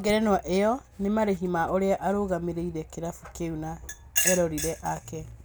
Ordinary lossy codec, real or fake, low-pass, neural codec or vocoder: none; real; none; none